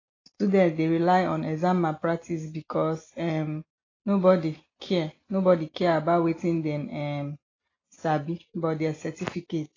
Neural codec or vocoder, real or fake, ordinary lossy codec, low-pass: none; real; AAC, 32 kbps; 7.2 kHz